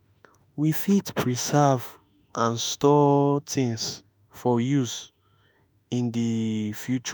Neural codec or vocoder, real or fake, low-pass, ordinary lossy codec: autoencoder, 48 kHz, 32 numbers a frame, DAC-VAE, trained on Japanese speech; fake; none; none